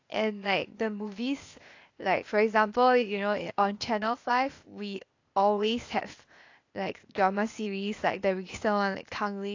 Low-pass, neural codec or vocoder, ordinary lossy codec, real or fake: 7.2 kHz; codec, 16 kHz, 0.8 kbps, ZipCodec; AAC, 48 kbps; fake